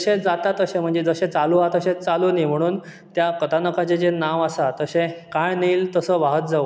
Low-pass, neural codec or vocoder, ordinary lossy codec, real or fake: none; none; none; real